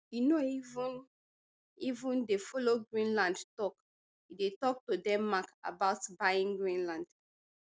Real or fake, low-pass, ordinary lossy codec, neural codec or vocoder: real; none; none; none